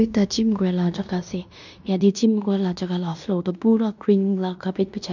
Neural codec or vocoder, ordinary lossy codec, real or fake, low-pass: codec, 16 kHz in and 24 kHz out, 0.9 kbps, LongCat-Audio-Codec, fine tuned four codebook decoder; none; fake; 7.2 kHz